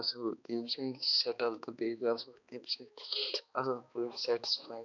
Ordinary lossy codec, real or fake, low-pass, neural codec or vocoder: none; fake; 7.2 kHz; codec, 16 kHz, 2 kbps, X-Codec, HuBERT features, trained on balanced general audio